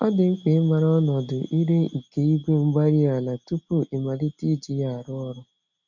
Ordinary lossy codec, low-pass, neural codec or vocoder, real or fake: none; 7.2 kHz; none; real